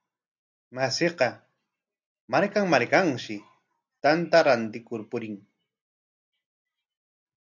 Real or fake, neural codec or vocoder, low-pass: real; none; 7.2 kHz